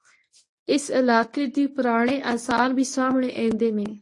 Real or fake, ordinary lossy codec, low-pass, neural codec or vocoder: fake; AAC, 48 kbps; 10.8 kHz; codec, 24 kHz, 0.9 kbps, WavTokenizer, medium speech release version 1